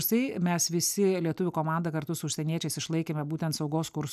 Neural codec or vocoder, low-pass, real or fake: none; 14.4 kHz; real